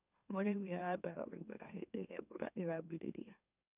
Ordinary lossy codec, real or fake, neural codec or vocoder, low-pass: none; fake; autoencoder, 44.1 kHz, a latent of 192 numbers a frame, MeloTTS; 3.6 kHz